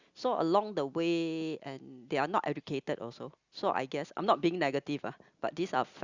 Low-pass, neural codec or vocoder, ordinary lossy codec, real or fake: 7.2 kHz; none; Opus, 64 kbps; real